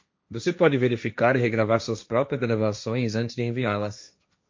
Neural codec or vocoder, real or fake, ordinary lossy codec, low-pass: codec, 16 kHz, 1.1 kbps, Voila-Tokenizer; fake; MP3, 48 kbps; 7.2 kHz